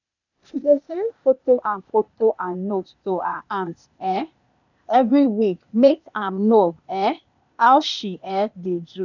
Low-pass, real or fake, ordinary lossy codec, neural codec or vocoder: 7.2 kHz; fake; none; codec, 16 kHz, 0.8 kbps, ZipCodec